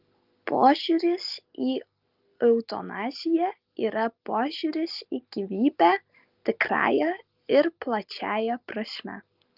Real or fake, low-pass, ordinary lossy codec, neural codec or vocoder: real; 5.4 kHz; Opus, 32 kbps; none